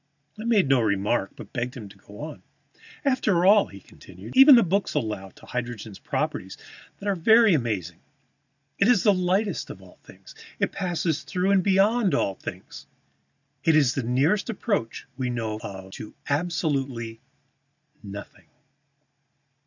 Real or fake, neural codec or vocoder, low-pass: real; none; 7.2 kHz